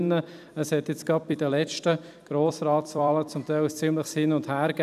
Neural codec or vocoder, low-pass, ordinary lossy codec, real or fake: vocoder, 44.1 kHz, 128 mel bands every 256 samples, BigVGAN v2; 14.4 kHz; none; fake